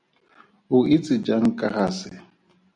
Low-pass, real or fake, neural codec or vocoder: 9.9 kHz; real; none